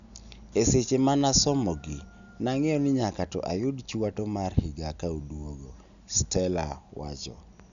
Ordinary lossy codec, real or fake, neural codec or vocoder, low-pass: none; real; none; 7.2 kHz